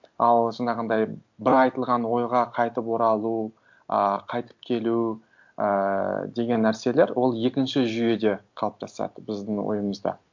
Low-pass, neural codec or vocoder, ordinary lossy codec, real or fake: 7.2 kHz; none; MP3, 64 kbps; real